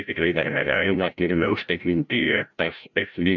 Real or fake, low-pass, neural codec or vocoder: fake; 7.2 kHz; codec, 16 kHz, 0.5 kbps, FreqCodec, larger model